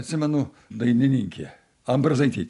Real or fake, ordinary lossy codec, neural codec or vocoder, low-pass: real; AAC, 96 kbps; none; 10.8 kHz